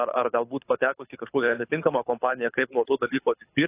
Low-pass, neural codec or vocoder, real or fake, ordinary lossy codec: 3.6 kHz; codec, 24 kHz, 6 kbps, HILCodec; fake; AAC, 24 kbps